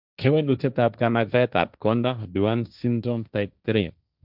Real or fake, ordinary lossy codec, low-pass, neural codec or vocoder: fake; none; 5.4 kHz; codec, 16 kHz, 1.1 kbps, Voila-Tokenizer